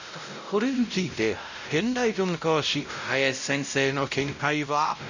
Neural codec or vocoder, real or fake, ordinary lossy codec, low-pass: codec, 16 kHz, 0.5 kbps, X-Codec, WavLM features, trained on Multilingual LibriSpeech; fake; none; 7.2 kHz